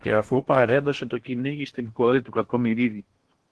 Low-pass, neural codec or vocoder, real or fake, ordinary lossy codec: 10.8 kHz; codec, 16 kHz in and 24 kHz out, 0.8 kbps, FocalCodec, streaming, 65536 codes; fake; Opus, 16 kbps